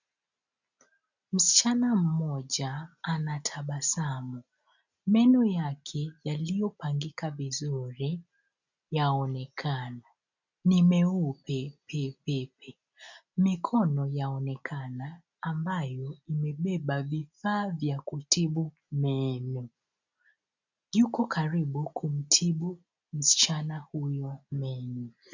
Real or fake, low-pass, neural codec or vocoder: real; 7.2 kHz; none